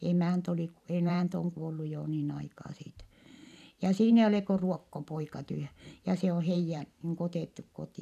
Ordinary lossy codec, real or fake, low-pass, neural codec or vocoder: none; fake; 14.4 kHz; vocoder, 44.1 kHz, 128 mel bands every 512 samples, BigVGAN v2